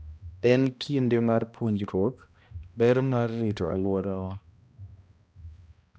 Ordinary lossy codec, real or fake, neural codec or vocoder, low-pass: none; fake; codec, 16 kHz, 1 kbps, X-Codec, HuBERT features, trained on balanced general audio; none